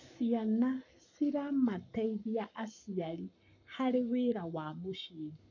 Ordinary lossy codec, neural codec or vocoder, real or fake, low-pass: none; codec, 44.1 kHz, 7.8 kbps, Pupu-Codec; fake; 7.2 kHz